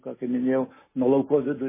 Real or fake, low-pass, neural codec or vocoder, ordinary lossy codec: real; 3.6 kHz; none; MP3, 16 kbps